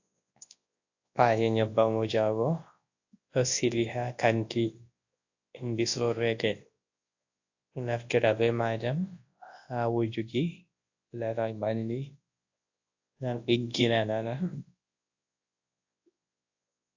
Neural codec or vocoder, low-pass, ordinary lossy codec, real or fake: codec, 24 kHz, 0.9 kbps, WavTokenizer, large speech release; 7.2 kHz; AAC, 48 kbps; fake